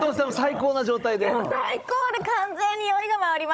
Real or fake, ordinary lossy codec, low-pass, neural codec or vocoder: fake; none; none; codec, 16 kHz, 16 kbps, FunCodec, trained on Chinese and English, 50 frames a second